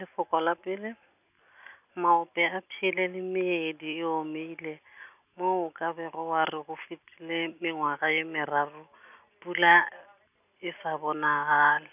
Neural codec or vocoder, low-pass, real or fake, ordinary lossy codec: none; 3.6 kHz; real; none